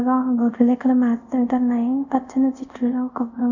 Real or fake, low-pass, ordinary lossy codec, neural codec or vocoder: fake; 7.2 kHz; none; codec, 24 kHz, 0.5 kbps, DualCodec